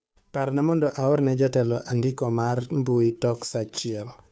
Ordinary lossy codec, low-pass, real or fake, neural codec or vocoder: none; none; fake; codec, 16 kHz, 2 kbps, FunCodec, trained on Chinese and English, 25 frames a second